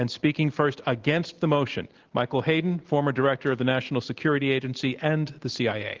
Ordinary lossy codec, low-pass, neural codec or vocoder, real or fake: Opus, 16 kbps; 7.2 kHz; none; real